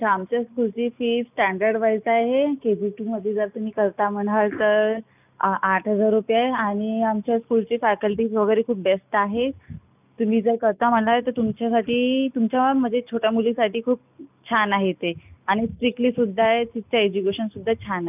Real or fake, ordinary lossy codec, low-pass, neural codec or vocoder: real; none; 3.6 kHz; none